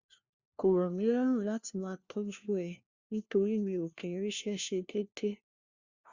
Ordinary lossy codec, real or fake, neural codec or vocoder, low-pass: Opus, 64 kbps; fake; codec, 16 kHz, 1 kbps, FunCodec, trained on LibriTTS, 50 frames a second; 7.2 kHz